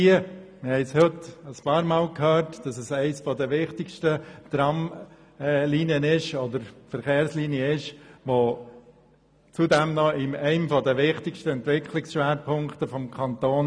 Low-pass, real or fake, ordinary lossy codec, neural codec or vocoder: none; real; none; none